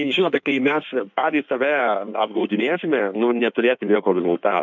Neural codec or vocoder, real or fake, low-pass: codec, 16 kHz in and 24 kHz out, 1.1 kbps, FireRedTTS-2 codec; fake; 7.2 kHz